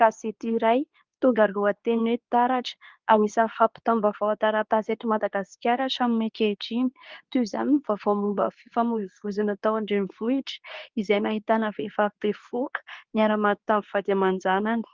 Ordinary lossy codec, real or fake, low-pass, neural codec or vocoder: Opus, 24 kbps; fake; 7.2 kHz; codec, 24 kHz, 0.9 kbps, WavTokenizer, medium speech release version 2